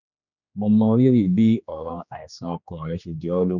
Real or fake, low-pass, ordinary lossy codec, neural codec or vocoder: fake; 7.2 kHz; none; codec, 16 kHz, 2 kbps, X-Codec, HuBERT features, trained on general audio